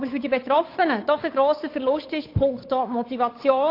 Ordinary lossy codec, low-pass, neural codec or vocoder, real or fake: AAC, 32 kbps; 5.4 kHz; codec, 16 kHz, 8 kbps, FunCodec, trained on Chinese and English, 25 frames a second; fake